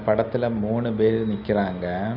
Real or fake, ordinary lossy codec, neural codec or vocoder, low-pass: real; none; none; 5.4 kHz